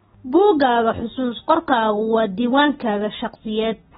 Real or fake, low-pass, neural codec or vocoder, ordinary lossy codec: real; 14.4 kHz; none; AAC, 16 kbps